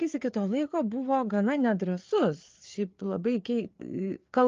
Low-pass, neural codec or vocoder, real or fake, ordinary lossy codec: 7.2 kHz; codec, 16 kHz, 4 kbps, FreqCodec, larger model; fake; Opus, 32 kbps